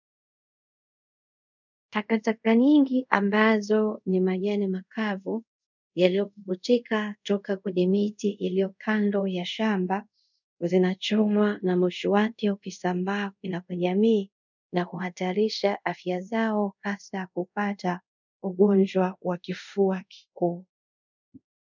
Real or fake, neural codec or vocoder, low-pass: fake; codec, 24 kHz, 0.5 kbps, DualCodec; 7.2 kHz